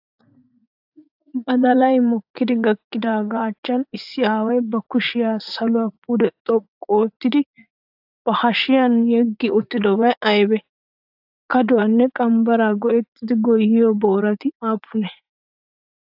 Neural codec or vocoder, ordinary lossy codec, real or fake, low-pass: vocoder, 44.1 kHz, 80 mel bands, Vocos; AAC, 48 kbps; fake; 5.4 kHz